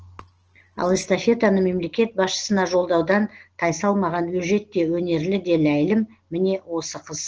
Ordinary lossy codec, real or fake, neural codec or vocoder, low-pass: Opus, 16 kbps; real; none; 7.2 kHz